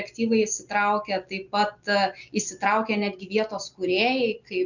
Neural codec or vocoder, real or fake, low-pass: none; real; 7.2 kHz